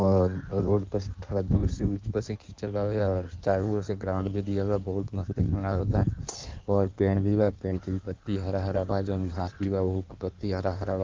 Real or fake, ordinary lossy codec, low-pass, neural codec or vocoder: fake; Opus, 24 kbps; 7.2 kHz; codec, 16 kHz in and 24 kHz out, 1.1 kbps, FireRedTTS-2 codec